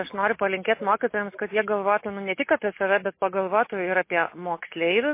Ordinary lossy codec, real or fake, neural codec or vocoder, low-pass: MP3, 24 kbps; real; none; 3.6 kHz